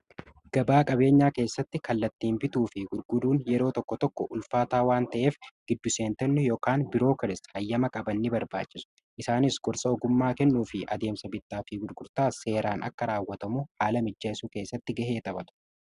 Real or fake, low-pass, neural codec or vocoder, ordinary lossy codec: real; 10.8 kHz; none; Opus, 64 kbps